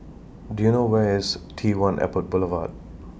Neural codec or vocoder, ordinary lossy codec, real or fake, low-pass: none; none; real; none